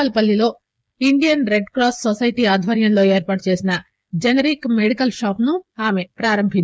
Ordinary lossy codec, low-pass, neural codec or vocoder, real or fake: none; none; codec, 16 kHz, 8 kbps, FreqCodec, smaller model; fake